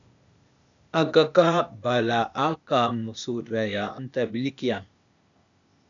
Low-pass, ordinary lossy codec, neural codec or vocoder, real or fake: 7.2 kHz; MP3, 96 kbps; codec, 16 kHz, 0.8 kbps, ZipCodec; fake